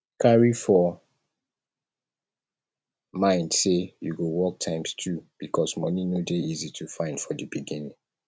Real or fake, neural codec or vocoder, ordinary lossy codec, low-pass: real; none; none; none